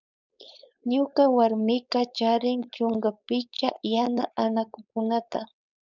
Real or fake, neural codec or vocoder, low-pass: fake; codec, 16 kHz, 4.8 kbps, FACodec; 7.2 kHz